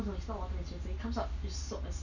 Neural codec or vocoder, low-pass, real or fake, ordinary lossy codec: none; 7.2 kHz; real; none